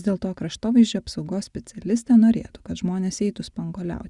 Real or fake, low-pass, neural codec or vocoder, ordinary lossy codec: real; 10.8 kHz; none; Opus, 64 kbps